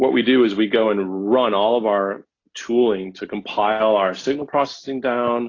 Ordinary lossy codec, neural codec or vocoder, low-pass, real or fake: AAC, 32 kbps; none; 7.2 kHz; real